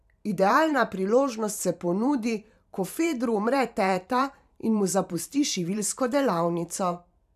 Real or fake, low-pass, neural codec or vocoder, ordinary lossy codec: fake; 14.4 kHz; vocoder, 44.1 kHz, 128 mel bands every 512 samples, BigVGAN v2; none